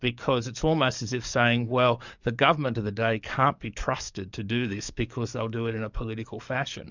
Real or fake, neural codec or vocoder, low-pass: fake; codec, 44.1 kHz, 7.8 kbps, DAC; 7.2 kHz